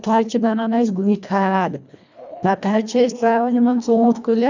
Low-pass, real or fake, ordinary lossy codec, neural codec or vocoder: 7.2 kHz; fake; none; codec, 24 kHz, 1.5 kbps, HILCodec